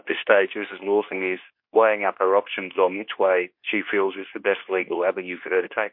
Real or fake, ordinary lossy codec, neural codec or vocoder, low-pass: fake; MP3, 32 kbps; codec, 24 kHz, 0.9 kbps, WavTokenizer, medium speech release version 2; 5.4 kHz